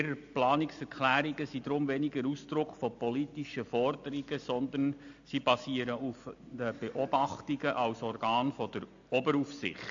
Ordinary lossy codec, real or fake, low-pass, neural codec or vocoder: AAC, 64 kbps; real; 7.2 kHz; none